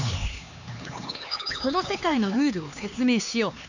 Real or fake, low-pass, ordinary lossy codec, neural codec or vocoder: fake; 7.2 kHz; none; codec, 16 kHz, 4 kbps, X-Codec, HuBERT features, trained on LibriSpeech